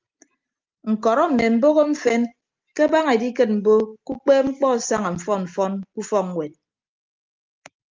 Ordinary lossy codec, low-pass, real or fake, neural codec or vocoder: Opus, 32 kbps; 7.2 kHz; real; none